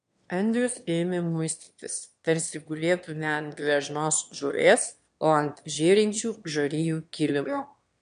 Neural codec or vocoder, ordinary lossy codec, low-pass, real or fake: autoencoder, 22.05 kHz, a latent of 192 numbers a frame, VITS, trained on one speaker; MP3, 64 kbps; 9.9 kHz; fake